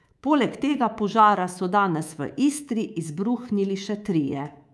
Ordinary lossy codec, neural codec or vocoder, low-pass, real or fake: none; codec, 24 kHz, 3.1 kbps, DualCodec; none; fake